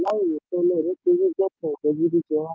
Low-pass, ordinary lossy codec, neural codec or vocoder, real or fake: none; none; none; real